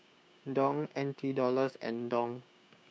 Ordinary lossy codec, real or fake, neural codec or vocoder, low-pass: none; fake; codec, 16 kHz, 6 kbps, DAC; none